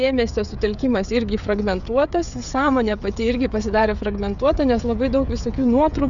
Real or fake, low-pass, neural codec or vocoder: fake; 7.2 kHz; codec, 16 kHz, 16 kbps, FreqCodec, smaller model